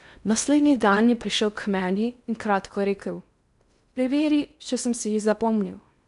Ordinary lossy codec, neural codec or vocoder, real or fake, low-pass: none; codec, 16 kHz in and 24 kHz out, 0.6 kbps, FocalCodec, streaming, 4096 codes; fake; 10.8 kHz